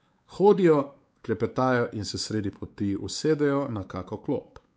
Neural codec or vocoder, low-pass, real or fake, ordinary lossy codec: codec, 16 kHz, 4 kbps, X-Codec, WavLM features, trained on Multilingual LibriSpeech; none; fake; none